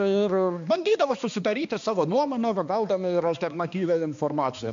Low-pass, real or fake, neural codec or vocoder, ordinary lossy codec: 7.2 kHz; fake; codec, 16 kHz, 2 kbps, X-Codec, HuBERT features, trained on balanced general audio; AAC, 64 kbps